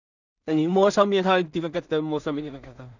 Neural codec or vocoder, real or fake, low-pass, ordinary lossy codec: codec, 16 kHz in and 24 kHz out, 0.4 kbps, LongCat-Audio-Codec, two codebook decoder; fake; 7.2 kHz; none